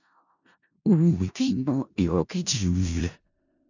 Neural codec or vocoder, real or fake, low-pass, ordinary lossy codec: codec, 16 kHz in and 24 kHz out, 0.4 kbps, LongCat-Audio-Codec, four codebook decoder; fake; 7.2 kHz; none